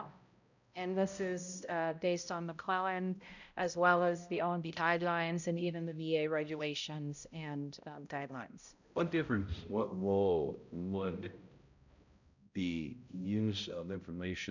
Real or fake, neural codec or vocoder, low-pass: fake; codec, 16 kHz, 0.5 kbps, X-Codec, HuBERT features, trained on balanced general audio; 7.2 kHz